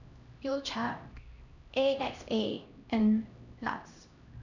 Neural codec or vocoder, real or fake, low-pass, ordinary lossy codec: codec, 16 kHz, 1 kbps, X-Codec, HuBERT features, trained on LibriSpeech; fake; 7.2 kHz; none